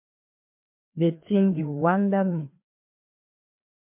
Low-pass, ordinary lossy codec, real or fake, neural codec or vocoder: 3.6 kHz; MP3, 32 kbps; fake; codec, 16 kHz, 2 kbps, FreqCodec, larger model